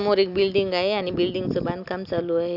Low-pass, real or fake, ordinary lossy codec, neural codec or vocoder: 5.4 kHz; real; none; none